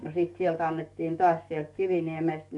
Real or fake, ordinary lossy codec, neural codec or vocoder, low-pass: fake; none; codec, 44.1 kHz, 7.8 kbps, DAC; 10.8 kHz